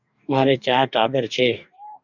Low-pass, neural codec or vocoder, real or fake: 7.2 kHz; codec, 44.1 kHz, 2.6 kbps, DAC; fake